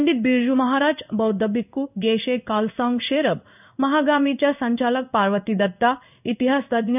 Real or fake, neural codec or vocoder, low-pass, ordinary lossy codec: fake; codec, 16 kHz in and 24 kHz out, 1 kbps, XY-Tokenizer; 3.6 kHz; none